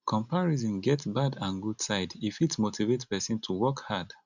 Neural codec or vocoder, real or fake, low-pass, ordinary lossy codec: none; real; 7.2 kHz; none